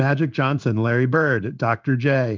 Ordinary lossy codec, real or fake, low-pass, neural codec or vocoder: Opus, 24 kbps; fake; 7.2 kHz; codec, 16 kHz in and 24 kHz out, 1 kbps, XY-Tokenizer